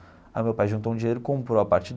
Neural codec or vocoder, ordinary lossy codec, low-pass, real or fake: none; none; none; real